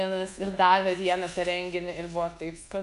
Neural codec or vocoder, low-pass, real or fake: codec, 24 kHz, 1.2 kbps, DualCodec; 10.8 kHz; fake